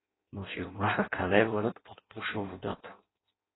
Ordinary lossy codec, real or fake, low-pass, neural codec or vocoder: AAC, 16 kbps; fake; 7.2 kHz; codec, 16 kHz in and 24 kHz out, 0.6 kbps, FireRedTTS-2 codec